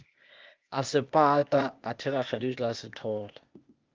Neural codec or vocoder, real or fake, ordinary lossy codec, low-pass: codec, 16 kHz, 0.8 kbps, ZipCodec; fake; Opus, 24 kbps; 7.2 kHz